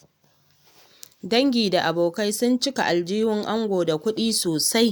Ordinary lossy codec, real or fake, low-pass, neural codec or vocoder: none; real; none; none